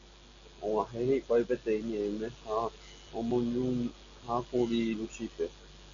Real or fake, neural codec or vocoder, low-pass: real; none; 7.2 kHz